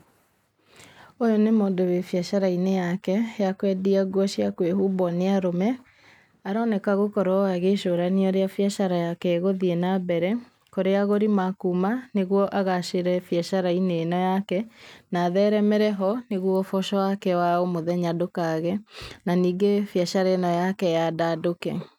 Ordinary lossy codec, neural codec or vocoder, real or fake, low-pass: none; none; real; 19.8 kHz